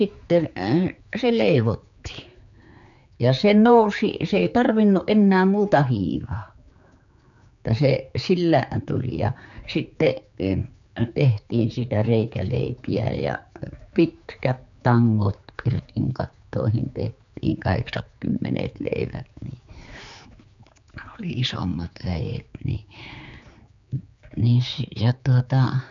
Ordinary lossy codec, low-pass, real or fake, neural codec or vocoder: MP3, 64 kbps; 7.2 kHz; fake; codec, 16 kHz, 4 kbps, X-Codec, HuBERT features, trained on general audio